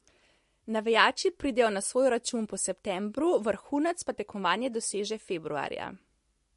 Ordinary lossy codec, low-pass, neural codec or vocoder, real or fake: MP3, 48 kbps; 14.4 kHz; none; real